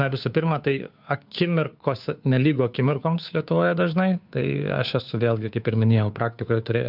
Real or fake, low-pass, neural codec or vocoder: fake; 5.4 kHz; codec, 16 kHz, 2 kbps, FunCodec, trained on Chinese and English, 25 frames a second